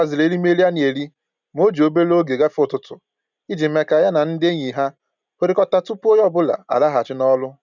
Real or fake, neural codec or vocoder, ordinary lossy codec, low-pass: real; none; none; 7.2 kHz